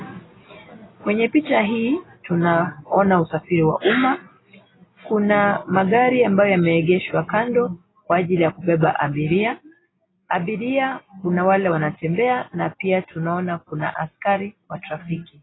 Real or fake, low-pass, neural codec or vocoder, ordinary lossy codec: real; 7.2 kHz; none; AAC, 16 kbps